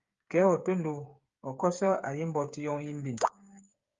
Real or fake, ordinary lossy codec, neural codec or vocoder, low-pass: fake; Opus, 32 kbps; codec, 16 kHz, 8 kbps, FreqCodec, smaller model; 7.2 kHz